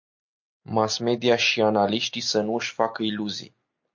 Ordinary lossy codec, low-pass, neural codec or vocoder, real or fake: MP3, 48 kbps; 7.2 kHz; none; real